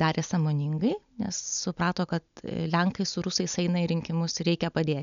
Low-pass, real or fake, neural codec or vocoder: 7.2 kHz; real; none